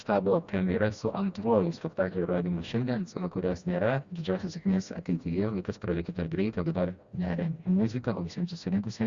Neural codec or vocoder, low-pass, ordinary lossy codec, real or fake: codec, 16 kHz, 1 kbps, FreqCodec, smaller model; 7.2 kHz; Opus, 64 kbps; fake